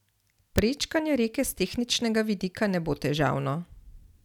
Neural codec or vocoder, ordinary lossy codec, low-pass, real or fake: none; none; 19.8 kHz; real